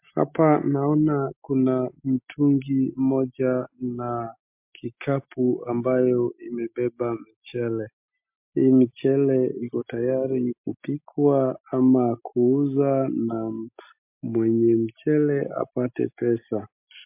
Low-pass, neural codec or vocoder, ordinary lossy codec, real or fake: 3.6 kHz; none; MP3, 32 kbps; real